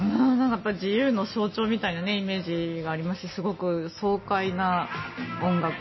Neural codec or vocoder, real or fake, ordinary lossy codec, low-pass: none; real; MP3, 24 kbps; 7.2 kHz